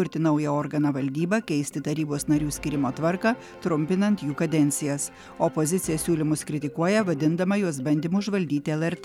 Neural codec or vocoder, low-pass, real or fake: none; 19.8 kHz; real